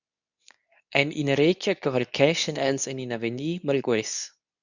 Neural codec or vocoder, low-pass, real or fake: codec, 24 kHz, 0.9 kbps, WavTokenizer, medium speech release version 2; 7.2 kHz; fake